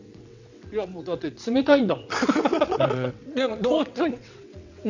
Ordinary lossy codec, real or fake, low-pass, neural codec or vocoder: none; fake; 7.2 kHz; vocoder, 22.05 kHz, 80 mel bands, WaveNeXt